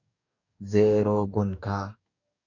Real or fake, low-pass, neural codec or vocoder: fake; 7.2 kHz; codec, 44.1 kHz, 2.6 kbps, DAC